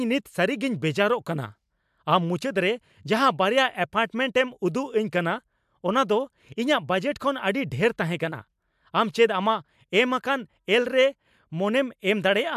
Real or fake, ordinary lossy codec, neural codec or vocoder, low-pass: real; MP3, 96 kbps; none; 14.4 kHz